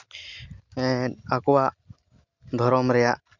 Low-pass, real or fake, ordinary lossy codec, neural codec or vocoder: 7.2 kHz; real; none; none